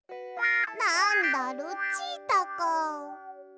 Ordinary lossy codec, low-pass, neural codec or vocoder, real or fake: none; none; none; real